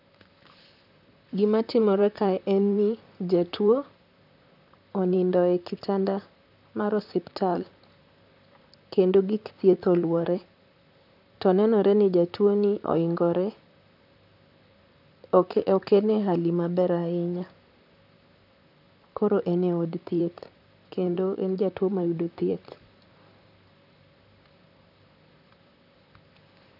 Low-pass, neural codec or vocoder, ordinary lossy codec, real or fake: 5.4 kHz; vocoder, 44.1 kHz, 128 mel bands, Pupu-Vocoder; none; fake